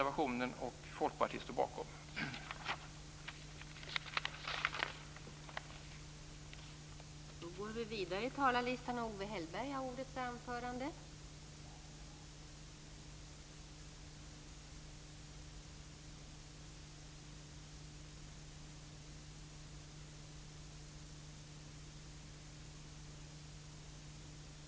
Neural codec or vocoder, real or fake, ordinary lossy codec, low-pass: none; real; none; none